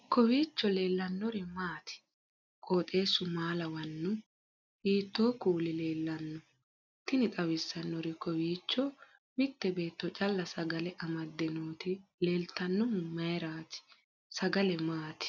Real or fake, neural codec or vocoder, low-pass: real; none; 7.2 kHz